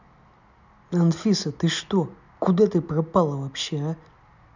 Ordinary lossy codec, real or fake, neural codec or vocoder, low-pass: none; real; none; 7.2 kHz